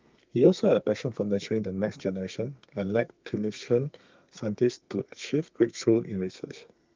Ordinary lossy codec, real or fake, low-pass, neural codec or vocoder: Opus, 24 kbps; fake; 7.2 kHz; codec, 32 kHz, 1.9 kbps, SNAC